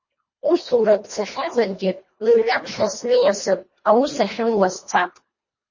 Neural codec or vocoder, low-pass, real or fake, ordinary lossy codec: codec, 24 kHz, 1.5 kbps, HILCodec; 7.2 kHz; fake; MP3, 32 kbps